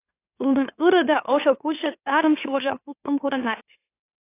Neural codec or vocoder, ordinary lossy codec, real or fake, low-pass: autoencoder, 44.1 kHz, a latent of 192 numbers a frame, MeloTTS; AAC, 24 kbps; fake; 3.6 kHz